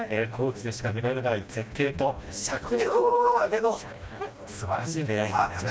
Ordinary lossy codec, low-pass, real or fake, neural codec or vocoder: none; none; fake; codec, 16 kHz, 1 kbps, FreqCodec, smaller model